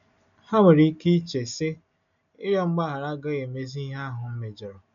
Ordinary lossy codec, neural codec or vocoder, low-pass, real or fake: none; none; 7.2 kHz; real